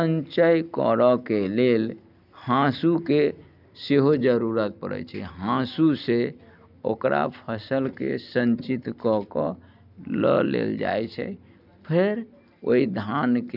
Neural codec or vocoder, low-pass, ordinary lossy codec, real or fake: none; 5.4 kHz; none; real